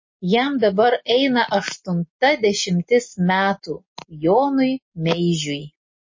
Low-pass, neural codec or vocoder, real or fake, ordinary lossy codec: 7.2 kHz; none; real; MP3, 32 kbps